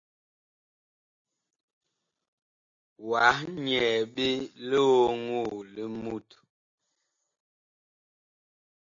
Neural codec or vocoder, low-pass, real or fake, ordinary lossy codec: none; 7.2 kHz; real; AAC, 48 kbps